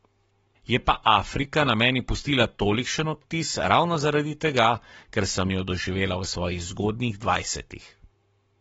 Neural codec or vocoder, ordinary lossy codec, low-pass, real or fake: codec, 44.1 kHz, 7.8 kbps, Pupu-Codec; AAC, 24 kbps; 19.8 kHz; fake